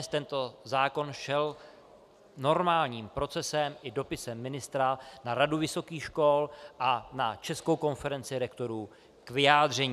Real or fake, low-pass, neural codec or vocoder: real; 14.4 kHz; none